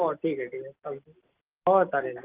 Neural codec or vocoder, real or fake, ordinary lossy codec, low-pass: none; real; Opus, 32 kbps; 3.6 kHz